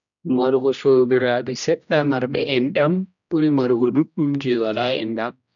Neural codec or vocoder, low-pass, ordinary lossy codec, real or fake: codec, 16 kHz, 1 kbps, X-Codec, HuBERT features, trained on general audio; 7.2 kHz; none; fake